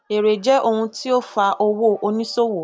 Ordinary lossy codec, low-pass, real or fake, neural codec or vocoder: none; none; real; none